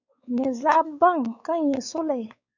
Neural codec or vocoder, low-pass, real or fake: codec, 16 kHz, 4 kbps, X-Codec, WavLM features, trained on Multilingual LibriSpeech; 7.2 kHz; fake